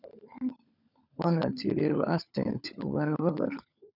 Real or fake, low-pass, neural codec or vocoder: fake; 5.4 kHz; codec, 16 kHz, 16 kbps, FunCodec, trained on LibriTTS, 50 frames a second